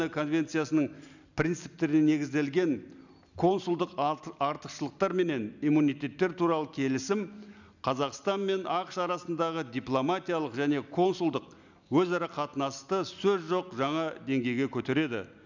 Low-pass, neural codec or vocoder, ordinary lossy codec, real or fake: 7.2 kHz; none; none; real